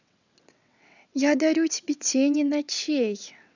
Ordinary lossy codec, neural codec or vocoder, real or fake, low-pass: none; vocoder, 22.05 kHz, 80 mel bands, WaveNeXt; fake; 7.2 kHz